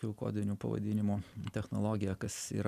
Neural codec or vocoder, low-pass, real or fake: none; 14.4 kHz; real